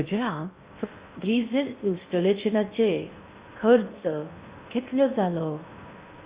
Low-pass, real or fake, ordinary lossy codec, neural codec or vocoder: 3.6 kHz; fake; Opus, 64 kbps; codec, 16 kHz in and 24 kHz out, 0.6 kbps, FocalCodec, streaming, 2048 codes